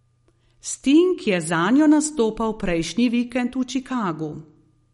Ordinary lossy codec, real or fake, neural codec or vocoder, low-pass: MP3, 48 kbps; real; none; 19.8 kHz